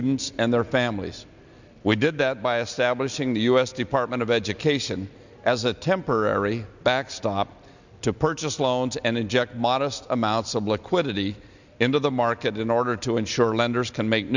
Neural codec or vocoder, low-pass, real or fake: none; 7.2 kHz; real